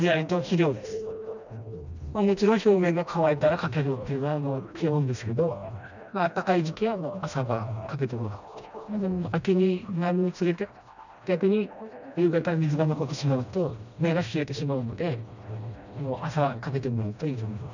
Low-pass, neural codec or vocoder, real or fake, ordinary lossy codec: 7.2 kHz; codec, 16 kHz, 1 kbps, FreqCodec, smaller model; fake; none